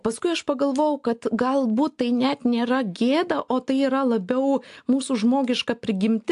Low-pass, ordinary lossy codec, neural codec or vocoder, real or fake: 10.8 kHz; AAC, 64 kbps; none; real